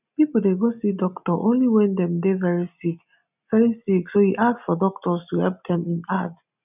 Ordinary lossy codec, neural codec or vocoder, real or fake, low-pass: none; none; real; 3.6 kHz